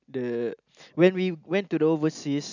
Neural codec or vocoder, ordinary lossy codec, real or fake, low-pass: none; none; real; 7.2 kHz